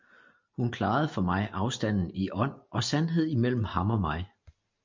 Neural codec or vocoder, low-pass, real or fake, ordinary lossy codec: none; 7.2 kHz; real; MP3, 48 kbps